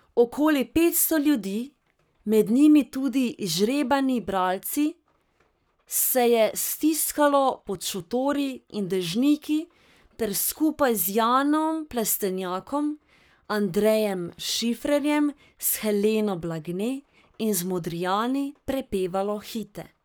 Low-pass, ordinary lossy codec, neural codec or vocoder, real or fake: none; none; codec, 44.1 kHz, 7.8 kbps, Pupu-Codec; fake